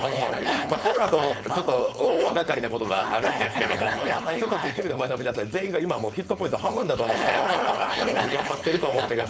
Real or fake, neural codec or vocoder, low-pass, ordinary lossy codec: fake; codec, 16 kHz, 4.8 kbps, FACodec; none; none